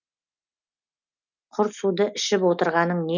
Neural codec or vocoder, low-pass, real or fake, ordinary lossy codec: none; 7.2 kHz; real; none